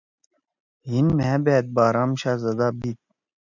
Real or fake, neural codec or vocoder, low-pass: real; none; 7.2 kHz